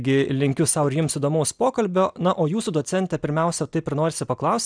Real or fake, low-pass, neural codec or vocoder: real; 9.9 kHz; none